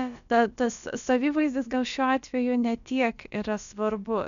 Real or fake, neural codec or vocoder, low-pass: fake; codec, 16 kHz, about 1 kbps, DyCAST, with the encoder's durations; 7.2 kHz